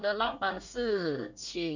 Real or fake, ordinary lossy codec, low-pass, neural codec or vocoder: fake; none; 7.2 kHz; codec, 24 kHz, 1 kbps, SNAC